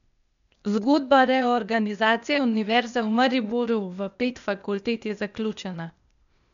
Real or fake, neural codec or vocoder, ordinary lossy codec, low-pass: fake; codec, 16 kHz, 0.8 kbps, ZipCodec; none; 7.2 kHz